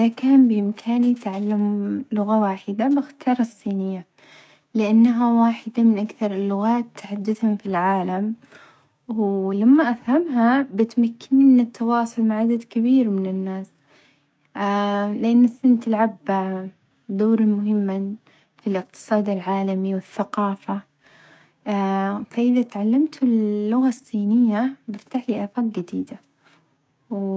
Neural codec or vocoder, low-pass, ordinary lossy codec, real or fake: codec, 16 kHz, 6 kbps, DAC; none; none; fake